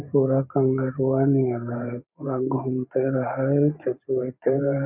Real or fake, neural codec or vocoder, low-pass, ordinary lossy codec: fake; vocoder, 44.1 kHz, 128 mel bands every 512 samples, BigVGAN v2; 3.6 kHz; none